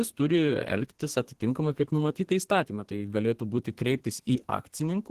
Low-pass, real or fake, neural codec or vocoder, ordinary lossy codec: 14.4 kHz; fake; codec, 32 kHz, 1.9 kbps, SNAC; Opus, 16 kbps